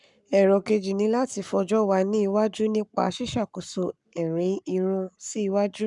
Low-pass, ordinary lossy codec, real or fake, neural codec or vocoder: 10.8 kHz; none; fake; codec, 44.1 kHz, 7.8 kbps, Pupu-Codec